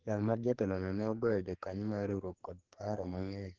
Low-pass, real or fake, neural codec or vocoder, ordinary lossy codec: 7.2 kHz; fake; codec, 44.1 kHz, 2.6 kbps, SNAC; Opus, 16 kbps